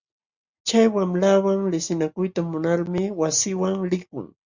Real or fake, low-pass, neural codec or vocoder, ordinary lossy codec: real; 7.2 kHz; none; Opus, 64 kbps